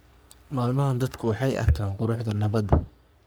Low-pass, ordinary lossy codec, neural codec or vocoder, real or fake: none; none; codec, 44.1 kHz, 3.4 kbps, Pupu-Codec; fake